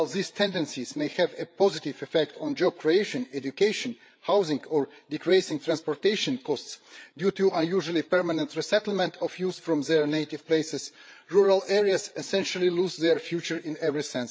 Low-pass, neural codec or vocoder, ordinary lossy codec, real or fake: none; codec, 16 kHz, 8 kbps, FreqCodec, larger model; none; fake